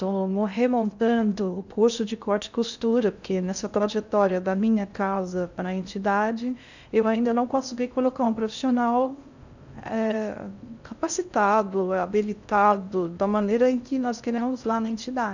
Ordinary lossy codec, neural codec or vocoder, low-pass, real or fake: none; codec, 16 kHz in and 24 kHz out, 0.6 kbps, FocalCodec, streaming, 2048 codes; 7.2 kHz; fake